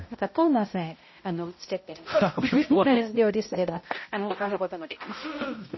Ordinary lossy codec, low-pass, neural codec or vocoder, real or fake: MP3, 24 kbps; 7.2 kHz; codec, 16 kHz, 0.5 kbps, X-Codec, HuBERT features, trained on balanced general audio; fake